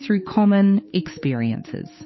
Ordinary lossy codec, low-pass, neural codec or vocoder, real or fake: MP3, 24 kbps; 7.2 kHz; codec, 16 kHz, 8 kbps, FunCodec, trained on Chinese and English, 25 frames a second; fake